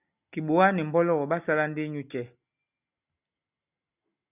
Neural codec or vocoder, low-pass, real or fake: none; 3.6 kHz; real